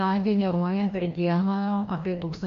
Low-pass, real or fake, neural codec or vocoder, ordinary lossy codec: 7.2 kHz; fake; codec, 16 kHz, 1 kbps, FreqCodec, larger model; MP3, 48 kbps